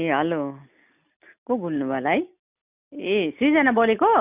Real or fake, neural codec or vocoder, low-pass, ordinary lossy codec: real; none; 3.6 kHz; none